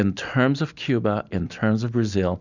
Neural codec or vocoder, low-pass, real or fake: none; 7.2 kHz; real